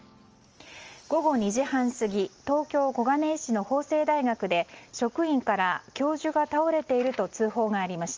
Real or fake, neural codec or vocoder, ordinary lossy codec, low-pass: real; none; Opus, 24 kbps; 7.2 kHz